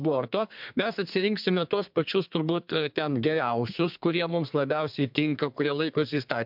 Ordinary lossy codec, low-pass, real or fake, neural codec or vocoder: MP3, 48 kbps; 5.4 kHz; fake; codec, 32 kHz, 1.9 kbps, SNAC